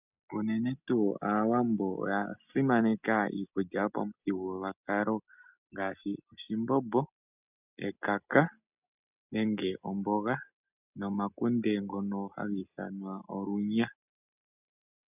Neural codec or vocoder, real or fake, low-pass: none; real; 3.6 kHz